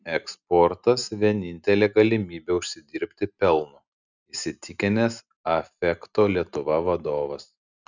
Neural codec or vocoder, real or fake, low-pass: none; real; 7.2 kHz